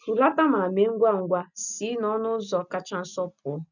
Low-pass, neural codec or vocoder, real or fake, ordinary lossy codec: 7.2 kHz; none; real; none